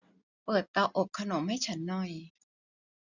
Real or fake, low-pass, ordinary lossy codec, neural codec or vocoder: real; 7.2 kHz; none; none